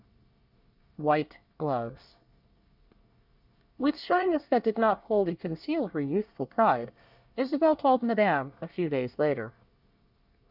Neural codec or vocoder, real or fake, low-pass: codec, 24 kHz, 1 kbps, SNAC; fake; 5.4 kHz